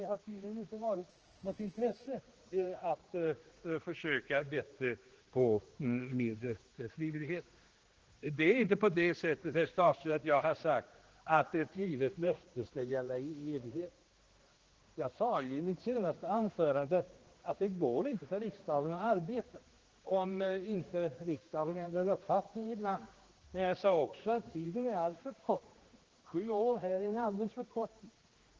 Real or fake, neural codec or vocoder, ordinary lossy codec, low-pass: fake; codec, 16 kHz, 2 kbps, X-Codec, HuBERT features, trained on general audio; Opus, 16 kbps; 7.2 kHz